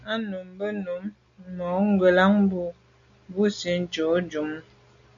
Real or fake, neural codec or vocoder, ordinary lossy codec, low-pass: real; none; MP3, 96 kbps; 7.2 kHz